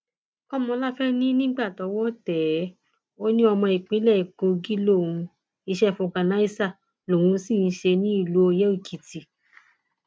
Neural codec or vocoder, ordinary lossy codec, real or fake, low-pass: none; none; real; none